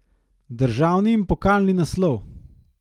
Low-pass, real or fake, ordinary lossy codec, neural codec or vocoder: 19.8 kHz; real; Opus, 24 kbps; none